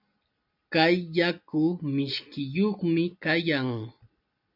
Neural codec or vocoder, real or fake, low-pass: none; real; 5.4 kHz